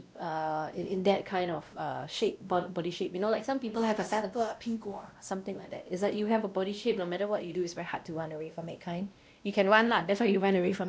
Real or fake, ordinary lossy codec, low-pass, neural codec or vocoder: fake; none; none; codec, 16 kHz, 1 kbps, X-Codec, WavLM features, trained on Multilingual LibriSpeech